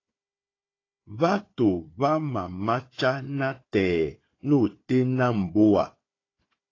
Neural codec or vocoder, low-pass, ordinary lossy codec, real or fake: codec, 16 kHz, 4 kbps, FunCodec, trained on Chinese and English, 50 frames a second; 7.2 kHz; AAC, 32 kbps; fake